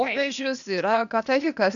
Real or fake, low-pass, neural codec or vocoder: fake; 7.2 kHz; codec, 16 kHz, 0.8 kbps, ZipCodec